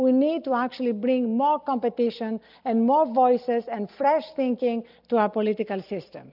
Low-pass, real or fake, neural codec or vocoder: 5.4 kHz; real; none